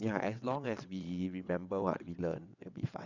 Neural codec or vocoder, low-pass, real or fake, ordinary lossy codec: vocoder, 22.05 kHz, 80 mel bands, WaveNeXt; 7.2 kHz; fake; none